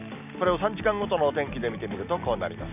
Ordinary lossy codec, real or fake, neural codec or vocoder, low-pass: none; real; none; 3.6 kHz